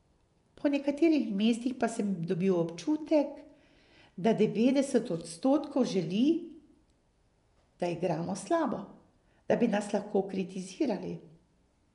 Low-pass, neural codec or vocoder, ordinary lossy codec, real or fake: 10.8 kHz; vocoder, 24 kHz, 100 mel bands, Vocos; none; fake